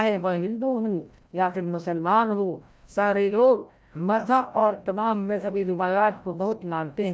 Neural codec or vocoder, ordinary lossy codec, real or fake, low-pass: codec, 16 kHz, 0.5 kbps, FreqCodec, larger model; none; fake; none